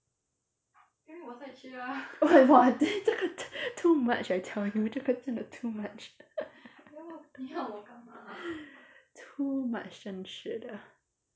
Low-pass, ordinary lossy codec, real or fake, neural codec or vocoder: none; none; real; none